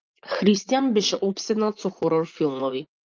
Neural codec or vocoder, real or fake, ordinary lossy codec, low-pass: vocoder, 44.1 kHz, 80 mel bands, Vocos; fake; Opus, 32 kbps; 7.2 kHz